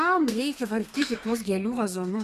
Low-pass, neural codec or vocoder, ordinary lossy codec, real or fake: 14.4 kHz; codec, 32 kHz, 1.9 kbps, SNAC; AAC, 96 kbps; fake